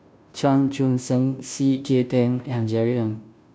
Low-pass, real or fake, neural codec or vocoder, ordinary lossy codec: none; fake; codec, 16 kHz, 0.5 kbps, FunCodec, trained on Chinese and English, 25 frames a second; none